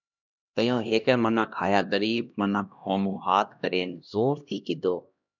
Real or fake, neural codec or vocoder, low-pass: fake; codec, 16 kHz, 1 kbps, X-Codec, HuBERT features, trained on LibriSpeech; 7.2 kHz